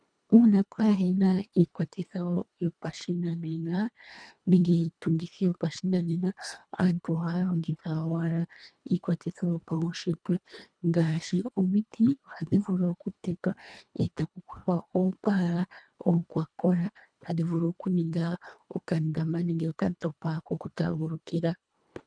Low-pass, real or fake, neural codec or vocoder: 9.9 kHz; fake; codec, 24 kHz, 1.5 kbps, HILCodec